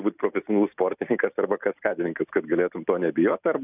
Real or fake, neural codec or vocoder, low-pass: real; none; 3.6 kHz